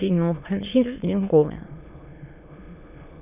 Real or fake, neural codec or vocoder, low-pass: fake; autoencoder, 22.05 kHz, a latent of 192 numbers a frame, VITS, trained on many speakers; 3.6 kHz